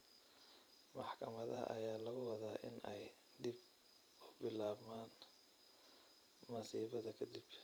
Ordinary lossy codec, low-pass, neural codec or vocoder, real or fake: none; none; vocoder, 44.1 kHz, 128 mel bands every 512 samples, BigVGAN v2; fake